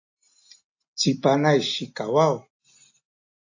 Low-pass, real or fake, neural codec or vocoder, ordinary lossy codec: 7.2 kHz; real; none; AAC, 48 kbps